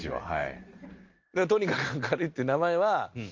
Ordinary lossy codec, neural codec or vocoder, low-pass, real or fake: Opus, 32 kbps; none; 7.2 kHz; real